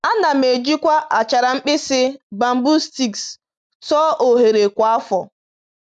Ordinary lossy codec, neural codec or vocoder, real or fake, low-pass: none; none; real; 9.9 kHz